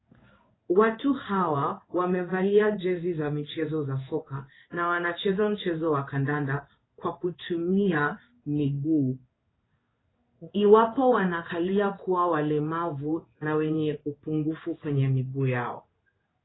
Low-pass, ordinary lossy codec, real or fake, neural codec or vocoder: 7.2 kHz; AAC, 16 kbps; fake; codec, 16 kHz in and 24 kHz out, 1 kbps, XY-Tokenizer